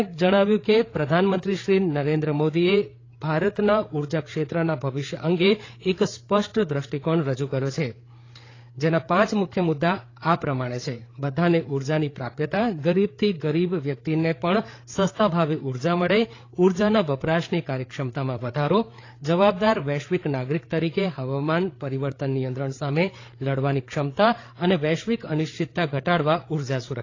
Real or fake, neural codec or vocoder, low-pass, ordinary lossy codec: fake; codec, 16 kHz, 8 kbps, FreqCodec, larger model; 7.2 kHz; AAC, 32 kbps